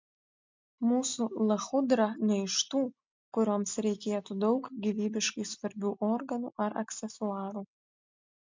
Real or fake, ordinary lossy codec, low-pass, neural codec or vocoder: real; MP3, 64 kbps; 7.2 kHz; none